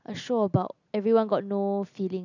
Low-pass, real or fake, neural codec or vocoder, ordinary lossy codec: 7.2 kHz; real; none; none